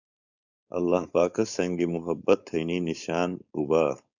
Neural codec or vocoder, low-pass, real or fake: codec, 16 kHz, 4.8 kbps, FACodec; 7.2 kHz; fake